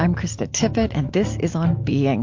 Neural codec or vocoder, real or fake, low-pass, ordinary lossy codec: none; real; 7.2 kHz; MP3, 48 kbps